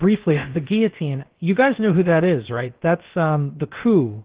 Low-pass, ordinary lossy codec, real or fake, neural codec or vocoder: 3.6 kHz; Opus, 16 kbps; fake; codec, 16 kHz, about 1 kbps, DyCAST, with the encoder's durations